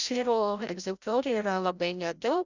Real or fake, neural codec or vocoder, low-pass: fake; codec, 16 kHz, 0.5 kbps, FreqCodec, larger model; 7.2 kHz